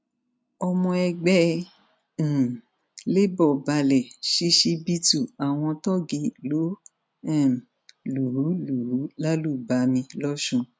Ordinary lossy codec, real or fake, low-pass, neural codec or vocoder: none; real; none; none